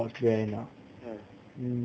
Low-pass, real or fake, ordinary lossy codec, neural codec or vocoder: none; real; none; none